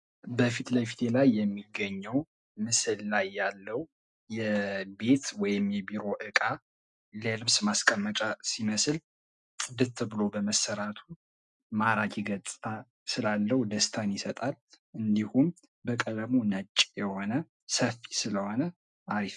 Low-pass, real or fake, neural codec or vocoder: 10.8 kHz; real; none